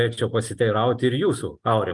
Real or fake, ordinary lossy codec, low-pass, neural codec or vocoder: real; Opus, 24 kbps; 10.8 kHz; none